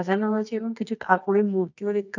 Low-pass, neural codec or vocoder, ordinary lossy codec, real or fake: 7.2 kHz; codec, 24 kHz, 0.9 kbps, WavTokenizer, medium music audio release; none; fake